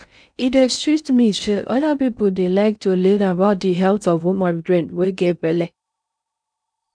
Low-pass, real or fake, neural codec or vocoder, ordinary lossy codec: 9.9 kHz; fake; codec, 16 kHz in and 24 kHz out, 0.6 kbps, FocalCodec, streaming, 2048 codes; none